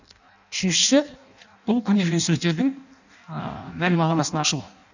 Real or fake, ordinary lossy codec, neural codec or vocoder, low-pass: fake; none; codec, 16 kHz in and 24 kHz out, 0.6 kbps, FireRedTTS-2 codec; 7.2 kHz